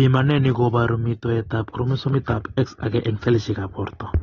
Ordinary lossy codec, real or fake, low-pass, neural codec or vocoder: AAC, 24 kbps; real; 7.2 kHz; none